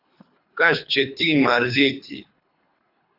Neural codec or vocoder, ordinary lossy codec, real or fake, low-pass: codec, 24 kHz, 3 kbps, HILCodec; AAC, 32 kbps; fake; 5.4 kHz